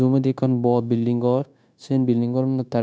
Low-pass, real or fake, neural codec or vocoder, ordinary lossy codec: none; fake; codec, 16 kHz, 0.9 kbps, LongCat-Audio-Codec; none